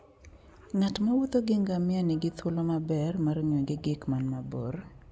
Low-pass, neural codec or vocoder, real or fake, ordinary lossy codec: none; none; real; none